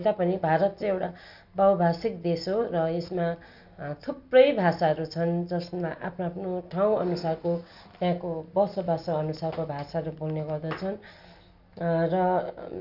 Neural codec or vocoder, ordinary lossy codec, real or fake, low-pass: none; none; real; 5.4 kHz